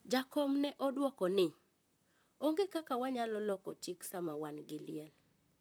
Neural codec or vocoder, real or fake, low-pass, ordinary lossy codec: vocoder, 44.1 kHz, 128 mel bands, Pupu-Vocoder; fake; none; none